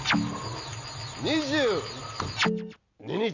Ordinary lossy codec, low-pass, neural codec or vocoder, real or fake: none; 7.2 kHz; none; real